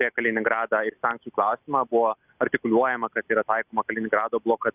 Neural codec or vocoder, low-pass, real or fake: none; 3.6 kHz; real